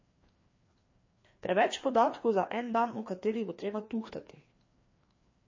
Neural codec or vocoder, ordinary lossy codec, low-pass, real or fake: codec, 16 kHz, 2 kbps, FreqCodec, larger model; MP3, 32 kbps; 7.2 kHz; fake